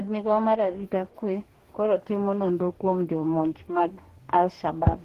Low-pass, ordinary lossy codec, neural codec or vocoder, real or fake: 14.4 kHz; Opus, 16 kbps; codec, 44.1 kHz, 2.6 kbps, DAC; fake